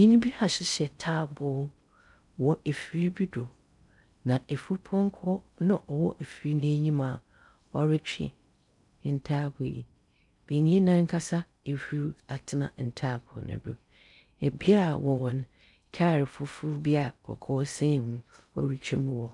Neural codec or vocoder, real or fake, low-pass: codec, 16 kHz in and 24 kHz out, 0.6 kbps, FocalCodec, streaming, 4096 codes; fake; 10.8 kHz